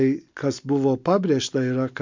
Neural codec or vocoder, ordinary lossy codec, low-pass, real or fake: none; MP3, 64 kbps; 7.2 kHz; real